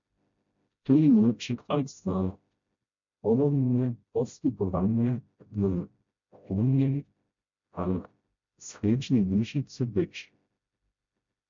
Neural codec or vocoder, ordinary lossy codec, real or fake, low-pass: codec, 16 kHz, 0.5 kbps, FreqCodec, smaller model; MP3, 48 kbps; fake; 7.2 kHz